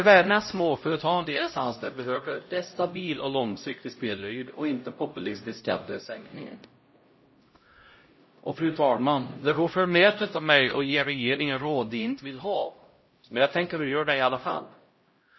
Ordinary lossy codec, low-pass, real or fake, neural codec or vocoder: MP3, 24 kbps; 7.2 kHz; fake; codec, 16 kHz, 0.5 kbps, X-Codec, HuBERT features, trained on LibriSpeech